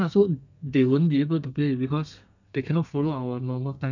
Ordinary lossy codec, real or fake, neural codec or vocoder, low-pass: none; fake; codec, 32 kHz, 1.9 kbps, SNAC; 7.2 kHz